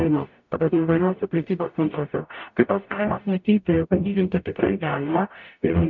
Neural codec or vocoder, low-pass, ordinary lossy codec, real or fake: codec, 44.1 kHz, 0.9 kbps, DAC; 7.2 kHz; MP3, 64 kbps; fake